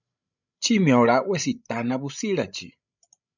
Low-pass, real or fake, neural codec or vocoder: 7.2 kHz; fake; codec, 16 kHz, 16 kbps, FreqCodec, larger model